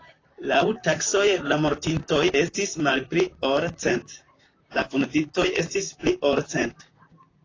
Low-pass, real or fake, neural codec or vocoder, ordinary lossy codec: 7.2 kHz; fake; vocoder, 44.1 kHz, 128 mel bands, Pupu-Vocoder; AAC, 32 kbps